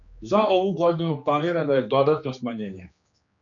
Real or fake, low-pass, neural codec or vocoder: fake; 7.2 kHz; codec, 16 kHz, 2 kbps, X-Codec, HuBERT features, trained on general audio